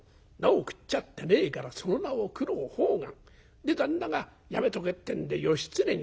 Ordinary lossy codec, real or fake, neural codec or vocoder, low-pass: none; real; none; none